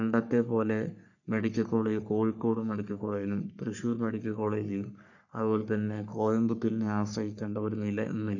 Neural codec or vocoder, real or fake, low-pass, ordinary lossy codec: codec, 44.1 kHz, 3.4 kbps, Pupu-Codec; fake; 7.2 kHz; none